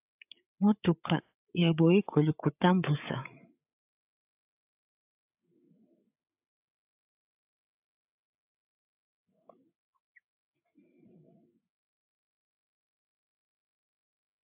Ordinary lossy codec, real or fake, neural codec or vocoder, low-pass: AAC, 32 kbps; fake; codec, 16 kHz, 8 kbps, FreqCodec, larger model; 3.6 kHz